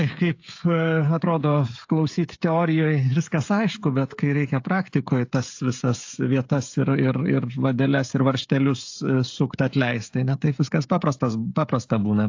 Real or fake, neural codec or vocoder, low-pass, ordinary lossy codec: fake; codec, 16 kHz, 16 kbps, FreqCodec, smaller model; 7.2 kHz; AAC, 48 kbps